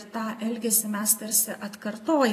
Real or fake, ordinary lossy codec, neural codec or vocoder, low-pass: fake; AAC, 48 kbps; vocoder, 44.1 kHz, 128 mel bands every 256 samples, BigVGAN v2; 14.4 kHz